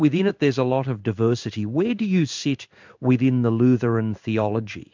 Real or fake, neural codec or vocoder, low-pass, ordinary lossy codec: fake; codec, 16 kHz in and 24 kHz out, 1 kbps, XY-Tokenizer; 7.2 kHz; MP3, 64 kbps